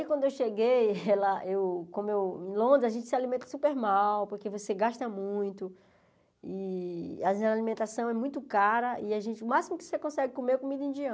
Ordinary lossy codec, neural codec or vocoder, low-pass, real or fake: none; none; none; real